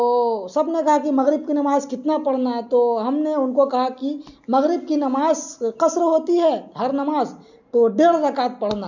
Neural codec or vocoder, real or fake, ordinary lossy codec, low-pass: none; real; none; 7.2 kHz